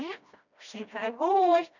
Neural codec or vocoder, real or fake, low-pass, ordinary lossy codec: codec, 16 kHz, 1 kbps, FreqCodec, smaller model; fake; 7.2 kHz; none